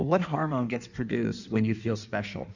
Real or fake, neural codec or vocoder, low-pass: fake; codec, 16 kHz in and 24 kHz out, 1.1 kbps, FireRedTTS-2 codec; 7.2 kHz